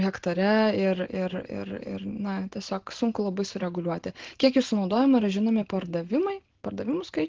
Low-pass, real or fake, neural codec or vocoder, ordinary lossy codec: 7.2 kHz; real; none; Opus, 16 kbps